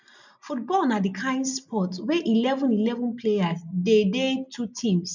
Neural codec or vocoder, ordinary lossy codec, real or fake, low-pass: none; none; real; 7.2 kHz